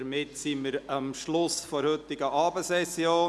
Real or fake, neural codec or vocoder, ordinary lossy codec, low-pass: real; none; none; none